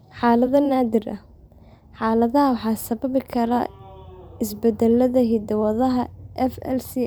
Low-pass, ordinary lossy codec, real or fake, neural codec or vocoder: none; none; fake; vocoder, 44.1 kHz, 128 mel bands every 512 samples, BigVGAN v2